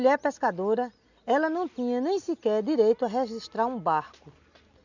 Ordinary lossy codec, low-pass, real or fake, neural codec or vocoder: none; 7.2 kHz; real; none